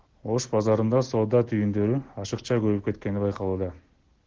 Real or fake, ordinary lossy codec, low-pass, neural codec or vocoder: real; Opus, 16 kbps; 7.2 kHz; none